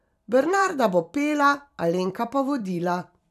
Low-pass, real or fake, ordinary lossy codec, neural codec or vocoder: 14.4 kHz; fake; none; vocoder, 44.1 kHz, 128 mel bands every 256 samples, BigVGAN v2